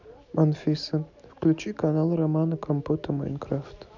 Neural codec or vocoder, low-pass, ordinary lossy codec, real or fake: none; 7.2 kHz; none; real